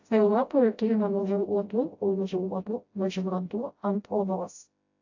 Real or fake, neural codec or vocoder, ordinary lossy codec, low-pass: fake; codec, 16 kHz, 0.5 kbps, FreqCodec, smaller model; AAC, 48 kbps; 7.2 kHz